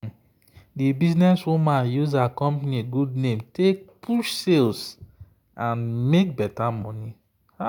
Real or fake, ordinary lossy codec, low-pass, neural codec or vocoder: real; none; 19.8 kHz; none